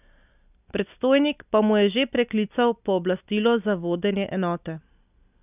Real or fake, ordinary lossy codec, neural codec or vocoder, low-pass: real; none; none; 3.6 kHz